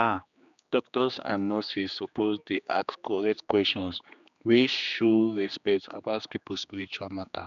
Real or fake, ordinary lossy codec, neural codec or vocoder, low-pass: fake; none; codec, 16 kHz, 2 kbps, X-Codec, HuBERT features, trained on general audio; 7.2 kHz